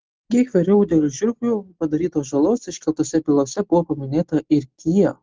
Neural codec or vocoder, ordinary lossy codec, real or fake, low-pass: none; Opus, 16 kbps; real; 7.2 kHz